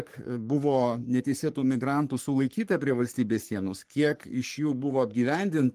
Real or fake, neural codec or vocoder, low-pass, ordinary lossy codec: fake; codec, 44.1 kHz, 3.4 kbps, Pupu-Codec; 14.4 kHz; Opus, 24 kbps